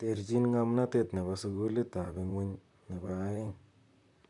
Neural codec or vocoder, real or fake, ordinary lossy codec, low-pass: vocoder, 44.1 kHz, 128 mel bands, Pupu-Vocoder; fake; none; 10.8 kHz